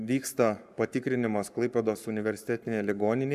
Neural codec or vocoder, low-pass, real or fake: codec, 44.1 kHz, 7.8 kbps, Pupu-Codec; 14.4 kHz; fake